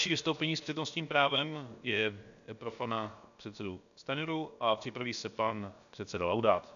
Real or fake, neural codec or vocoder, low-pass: fake; codec, 16 kHz, about 1 kbps, DyCAST, with the encoder's durations; 7.2 kHz